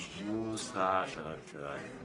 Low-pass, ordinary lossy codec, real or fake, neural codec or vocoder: 10.8 kHz; AAC, 32 kbps; fake; codec, 44.1 kHz, 1.7 kbps, Pupu-Codec